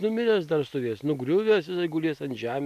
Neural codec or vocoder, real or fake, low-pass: none; real; 14.4 kHz